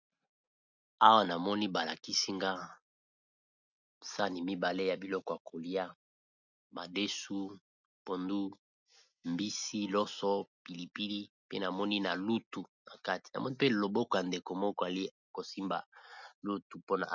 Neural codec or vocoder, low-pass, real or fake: none; 7.2 kHz; real